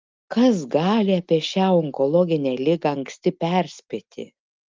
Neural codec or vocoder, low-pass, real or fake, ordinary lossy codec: none; 7.2 kHz; real; Opus, 32 kbps